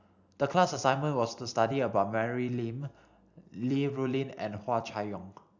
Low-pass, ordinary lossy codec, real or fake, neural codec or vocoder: 7.2 kHz; none; real; none